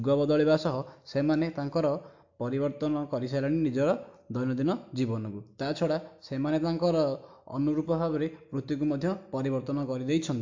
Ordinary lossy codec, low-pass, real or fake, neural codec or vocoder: AAC, 48 kbps; 7.2 kHz; real; none